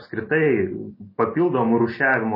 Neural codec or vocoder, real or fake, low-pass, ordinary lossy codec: none; real; 5.4 kHz; MP3, 24 kbps